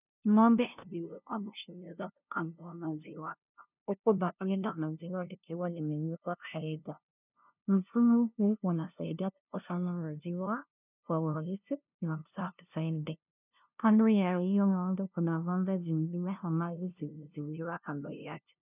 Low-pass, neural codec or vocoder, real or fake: 3.6 kHz; codec, 16 kHz, 0.5 kbps, FunCodec, trained on LibriTTS, 25 frames a second; fake